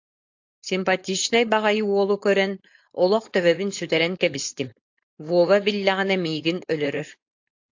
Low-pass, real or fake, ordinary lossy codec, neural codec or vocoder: 7.2 kHz; fake; AAC, 48 kbps; codec, 16 kHz, 4.8 kbps, FACodec